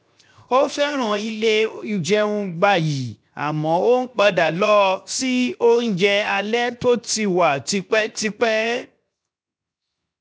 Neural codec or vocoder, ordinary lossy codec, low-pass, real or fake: codec, 16 kHz, 0.7 kbps, FocalCodec; none; none; fake